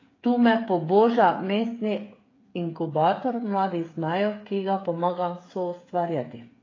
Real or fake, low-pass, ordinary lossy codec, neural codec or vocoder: fake; 7.2 kHz; AAC, 32 kbps; codec, 16 kHz, 8 kbps, FreqCodec, smaller model